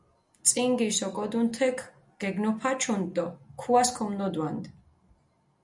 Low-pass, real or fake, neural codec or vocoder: 10.8 kHz; real; none